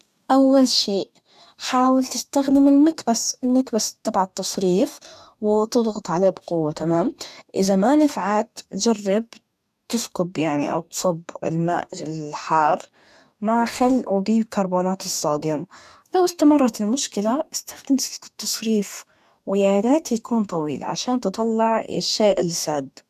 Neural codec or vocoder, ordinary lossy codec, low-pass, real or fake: codec, 44.1 kHz, 2.6 kbps, DAC; none; 14.4 kHz; fake